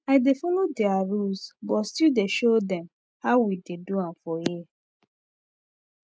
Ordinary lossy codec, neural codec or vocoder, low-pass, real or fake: none; none; none; real